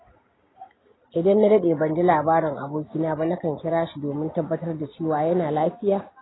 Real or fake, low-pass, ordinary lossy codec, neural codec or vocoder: real; 7.2 kHz; AAC, 16 kbps; none